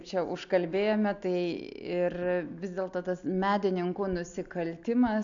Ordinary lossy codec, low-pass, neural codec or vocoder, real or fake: AAC, 64 kbps; 7.2 kHz; none; real